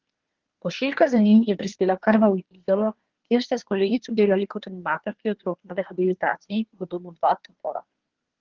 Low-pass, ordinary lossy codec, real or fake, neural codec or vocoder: 7.2 kHz; Opus, 16 kbps; fake; codec, 24 kHz, 1 kbps, SNAC